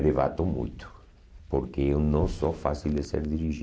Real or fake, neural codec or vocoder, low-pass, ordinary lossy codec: real; none; none; none